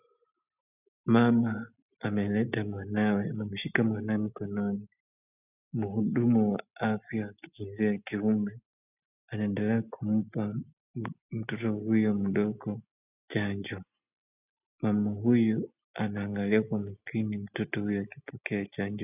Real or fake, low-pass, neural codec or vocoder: real; 3.6 kHz; none